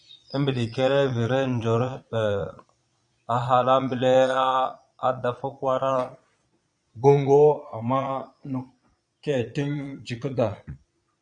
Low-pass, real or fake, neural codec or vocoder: 9.9 kHz; fake; vocoder, 22.05 kHz, 80 mel bands, Vocos